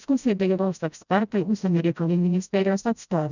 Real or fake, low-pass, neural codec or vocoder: fake; 7.2 kHz; codec, 16 kHz, 0.5 kbps, FreqCodec, smaller model